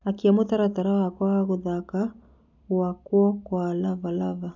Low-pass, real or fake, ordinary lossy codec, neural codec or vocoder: 7.2 kHz; real; none; none